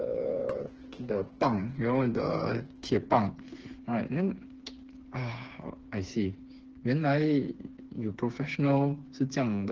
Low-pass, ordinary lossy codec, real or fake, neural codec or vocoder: 7.2 kHz; Opus, 16 kbps; fake; codec, 16 kHz, 4 kbps, FreqCodec, smaller model